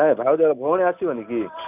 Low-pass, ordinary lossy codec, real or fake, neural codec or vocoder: 3.6 kHz; none; real; none